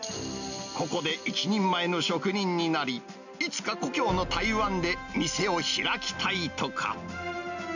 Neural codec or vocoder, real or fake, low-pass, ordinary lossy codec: none; real; 7.2 kHz; none